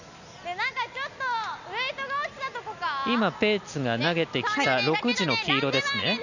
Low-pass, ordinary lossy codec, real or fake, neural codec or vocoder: 7.2 kHz; none; real; none